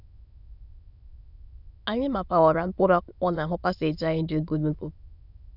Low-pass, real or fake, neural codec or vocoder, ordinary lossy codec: 5.4 kHz; fake; autoencoder, 22.05 kHz, a latent of 192 numbers a frame, VITS, trained on many speakers; none